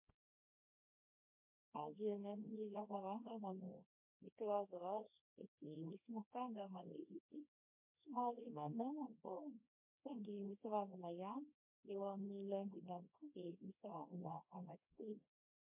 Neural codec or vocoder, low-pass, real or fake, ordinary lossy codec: codec, 24 kHz, 0.9 kbps, WavTokenizer, small release; 3.6 kHz; fake; MP3, 32 kbps